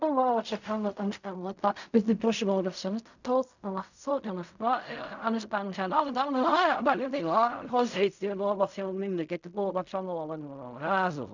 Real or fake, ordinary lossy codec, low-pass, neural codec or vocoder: fake; none; 7.2 kHz; codec, 16 kHz in and 24 kHz out, 0.4 kbps, LongCat-Audio-Codec, fine tuned four codebook decoder